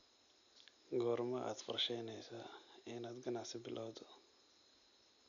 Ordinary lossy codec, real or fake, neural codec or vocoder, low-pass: MP3, 96 kbps; real; none; 7.2 kHz